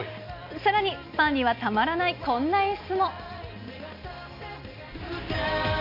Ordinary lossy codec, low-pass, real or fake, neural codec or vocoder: none; 5.4 kHz; real; none